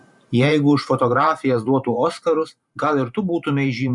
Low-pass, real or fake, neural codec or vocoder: 10.8 kHz; fake; vocoder, 44.1 kHz, 128 mel bands every 512 samples, BigVGAN v2